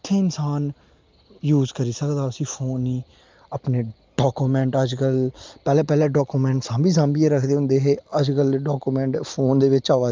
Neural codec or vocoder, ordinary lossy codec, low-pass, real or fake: none; Opus, 24 kbps; 7.2 kHz; real